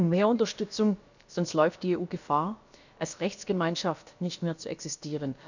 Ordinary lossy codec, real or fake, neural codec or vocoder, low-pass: none; fake; codec, 16 kHz, about 1 kbps, DyCAST, with the encoder's durations; 7.2 kHz